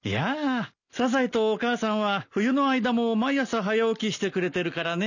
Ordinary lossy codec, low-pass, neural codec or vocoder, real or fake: none; 7.2 kHz; none; real